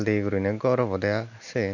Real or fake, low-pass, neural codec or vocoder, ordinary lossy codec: real; 7.2 kHz; none; none